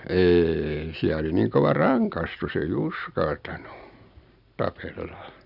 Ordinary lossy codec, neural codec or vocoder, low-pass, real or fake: none; none; 5.4 kHz; real